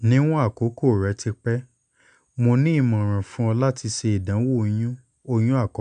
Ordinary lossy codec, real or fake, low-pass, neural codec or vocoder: none; real; 9.9 kHz; none